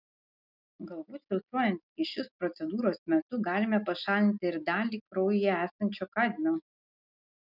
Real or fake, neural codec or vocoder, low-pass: real; none; 5.4 kHz